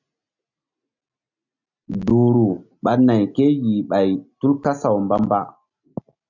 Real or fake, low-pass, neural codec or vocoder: real; 7.2 kHz; none